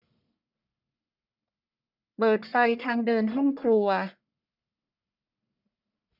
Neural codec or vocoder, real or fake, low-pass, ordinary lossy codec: codec, 44.1 kHz, 1.7 kbps, Pupu-Codec; fake; 5.4 kHz; none